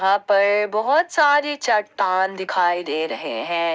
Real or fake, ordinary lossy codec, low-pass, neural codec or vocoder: real; none; none; none